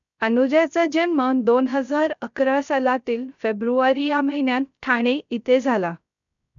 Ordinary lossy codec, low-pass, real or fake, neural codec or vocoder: none; 7.2 kHz; fake; codec, 16 kHz, 0.3 kbps, FocalCodec